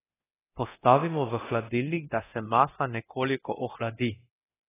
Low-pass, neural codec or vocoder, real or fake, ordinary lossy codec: 3.6 kHz; codec, 24 kHz, 0.9 kbps, DualCodec; fake; AAC, 16 kbps